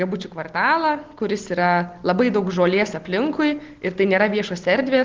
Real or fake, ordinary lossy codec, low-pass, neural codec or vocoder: real; Opus, 16 kbps; 7.2 kHz; none